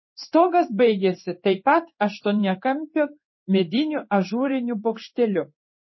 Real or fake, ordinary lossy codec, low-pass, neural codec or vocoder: fake; MP3, 24 kbps; 7.2 kHz; codec, 16 kHz in and 24 kHz out, 1 kbps, XY-Tokenizer